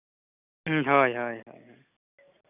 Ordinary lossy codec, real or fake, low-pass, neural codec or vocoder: none; real; 3.6 kHz; none